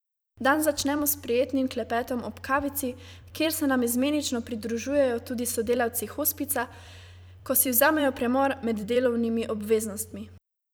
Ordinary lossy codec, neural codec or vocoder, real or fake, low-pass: none; vocoder, 44.1 kHz, 128 mel bands every 256 samples, BigVGAN v2; fake; none